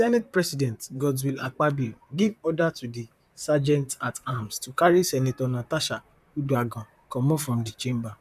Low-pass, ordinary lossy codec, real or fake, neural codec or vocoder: 14.4 kHz; none; fake; vocoder, 44.1 kHz, 128 mel bands, Pupu-Vocoder